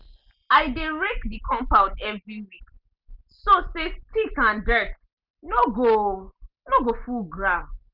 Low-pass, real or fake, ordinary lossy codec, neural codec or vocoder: 5.4 kHz; real; none; none